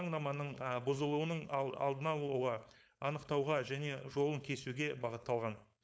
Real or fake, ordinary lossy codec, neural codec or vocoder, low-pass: fake; none; codec, 16 kHz, 4.8 kbps, FACodec; none